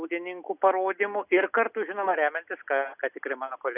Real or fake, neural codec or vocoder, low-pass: real; none; 3.6 kHz